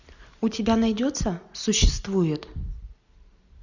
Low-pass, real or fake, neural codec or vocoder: 7.2 kHz; real; none